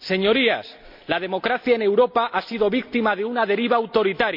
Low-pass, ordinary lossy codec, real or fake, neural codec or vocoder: 5.4 kHz; MP3, 48 kbps; real; none